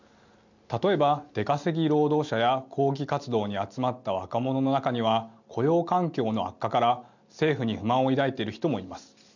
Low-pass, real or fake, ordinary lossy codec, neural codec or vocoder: 7.2 kHz; real; none; none